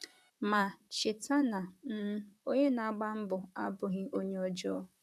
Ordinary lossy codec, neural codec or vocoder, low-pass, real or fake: none; vocoder, 44.1 kHz, 128 mel bands, Pupu-Vocoder; 14.4 kHz; fake